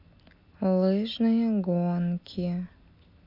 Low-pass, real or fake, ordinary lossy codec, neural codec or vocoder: 5.4 kHz; real; none; none